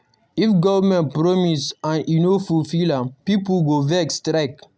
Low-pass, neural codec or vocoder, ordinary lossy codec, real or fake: none; none; none; real